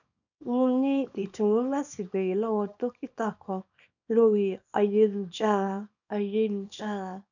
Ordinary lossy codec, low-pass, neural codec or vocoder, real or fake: none; 7.2 kHz; codec, 24 kHz, 0.9 kbps, WavTokenizer, small release; fake